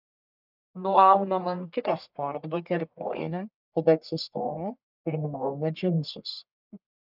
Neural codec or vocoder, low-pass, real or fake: codec, 44.1 kHz, 1.7 kbps, Pupu-Codec; 5.4 kHz; fake